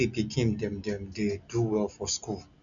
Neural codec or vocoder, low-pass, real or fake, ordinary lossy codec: none; 7.2 kHz; real; none